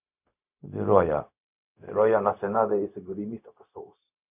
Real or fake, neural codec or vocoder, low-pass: fake; codec, 16 kHz, 0.4 kbps, LongCat-Audio-Codec; 3.6 kHz